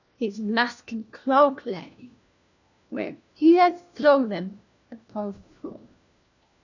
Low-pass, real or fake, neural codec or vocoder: 7.2 kHz; fake; codec, 16 kHz, 1 kbps, FunCodec, trained on LibriTTS, 50 frames a second